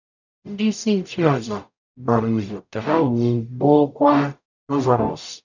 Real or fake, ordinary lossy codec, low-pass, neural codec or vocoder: fake; none; 7.2 kHz; codec, 44.1 kHz, 0.9 kbps, DAC